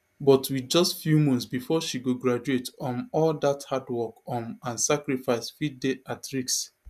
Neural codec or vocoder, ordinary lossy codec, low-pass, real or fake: none; none; 14.4 kHz; real